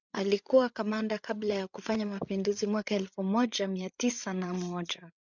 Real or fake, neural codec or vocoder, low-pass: fake; codec, 16 kHz, 8 kbps, FreqCodec, larger model; 7.2 kHz